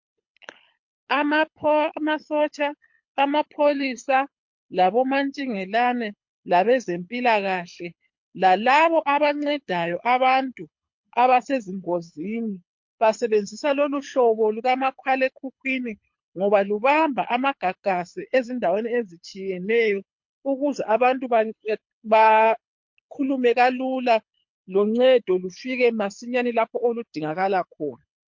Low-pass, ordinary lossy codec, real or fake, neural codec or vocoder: 7.2 kHz; MP3, 48 kbps; fake; codec, 24 kHz, 6 kbps, HILCodec